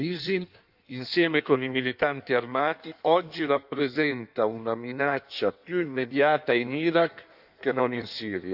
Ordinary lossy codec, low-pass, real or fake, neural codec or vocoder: none; 5.4 kHz; fake; codec, 16 kHz in and 24 kHz out, 1.1 kbps, FireRedTTS-2 codec